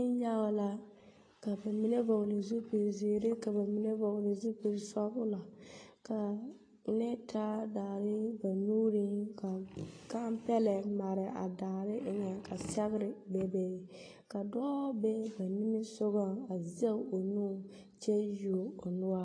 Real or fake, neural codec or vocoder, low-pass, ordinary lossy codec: real; none; 9.9 kHz; AAC, 32 kbps